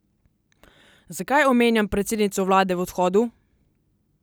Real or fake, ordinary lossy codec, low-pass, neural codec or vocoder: real; none; none; none